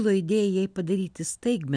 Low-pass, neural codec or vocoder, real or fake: 9.9 kHz; none; real